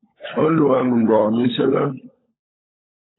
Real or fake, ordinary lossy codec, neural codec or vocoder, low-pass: fake; AAC, 16 kbps; codec, 16 kHz, 16 kbps, FunCodec, trained on LibriTTS, 50 frames a second; 7.2 kHz